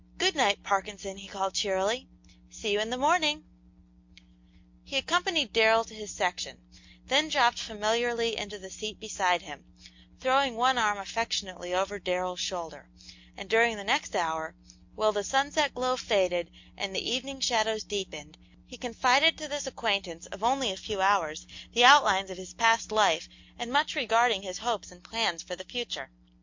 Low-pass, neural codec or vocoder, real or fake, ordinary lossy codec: 7.2 kHz; none; real; MP3, 48 kbps